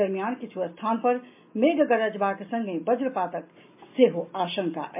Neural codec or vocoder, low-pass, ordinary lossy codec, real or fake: none; 3.6 kHz; MP3, 32 kbps; real